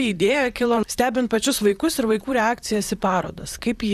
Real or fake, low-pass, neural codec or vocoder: fake; 14.4 kHz; vocoder, 44.1 kHz, 128 mel bands, Pupu-Vocoder